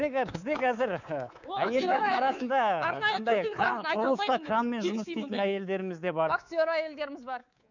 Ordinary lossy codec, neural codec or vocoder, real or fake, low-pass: none; codec, 24 kHz, 3.1 kbps, DualCodec; fake; 7.2 kHz